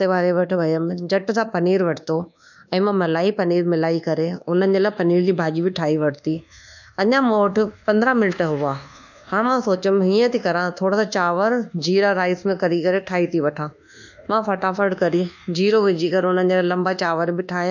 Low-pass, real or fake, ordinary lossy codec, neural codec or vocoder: 7.2 kHz; fake; none; codec, 24 kHz, 1.2 kbps, DualCodec